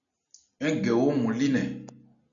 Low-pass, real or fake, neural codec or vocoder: 7.2 kHz; real; none